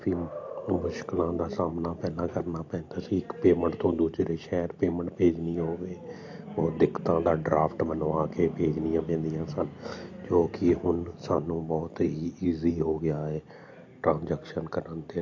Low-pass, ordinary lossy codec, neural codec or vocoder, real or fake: 7.2 kHz; AAC, 48 kbps; none; real